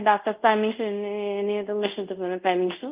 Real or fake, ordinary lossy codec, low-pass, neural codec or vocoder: fake; Opus, 32 kbps; 3.6 kHz; codec, 24 kHz, 0.5 kbps, DualCodec